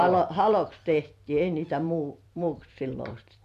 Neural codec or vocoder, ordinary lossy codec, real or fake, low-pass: vocoder, 44.1 kHz, 128 mel bands every 256 samples, BigVGAN v2; none; fake; 14.4 kHz